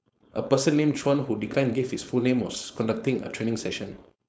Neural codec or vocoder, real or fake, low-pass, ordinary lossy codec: codec, 16 kHz, 4.8 kbps, FACodec; fake; none; none